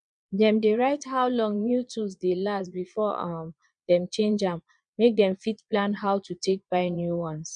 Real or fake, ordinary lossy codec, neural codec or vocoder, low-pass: fake; none; vocoder, 22.05 kHz, 80 mel bands, Vocos; 9.9 kHz